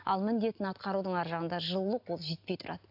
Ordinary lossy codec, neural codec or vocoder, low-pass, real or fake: AAC, 48 kbps; none; 5.4 kHz; real